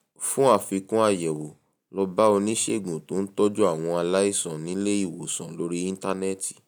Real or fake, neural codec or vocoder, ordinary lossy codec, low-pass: real; none; none; none